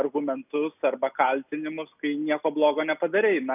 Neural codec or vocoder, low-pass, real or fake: none; 3.6 kHz; real